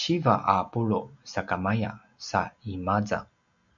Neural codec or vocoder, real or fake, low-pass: none; real; 7.2 kHz